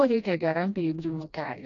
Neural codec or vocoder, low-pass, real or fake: codec, 16 kHz, 1 kbps, FreqCodec, smaller model; 7.2 kHz; fake